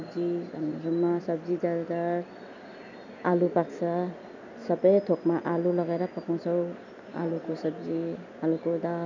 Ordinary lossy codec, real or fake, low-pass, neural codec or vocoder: none; real; 7.2 kHz; none